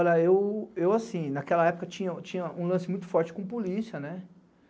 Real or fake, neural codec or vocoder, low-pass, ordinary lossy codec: real; none; none; none